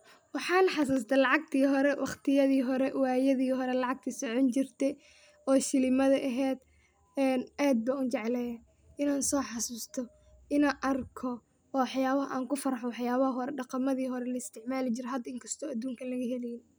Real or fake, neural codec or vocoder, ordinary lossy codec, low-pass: real; none; none; none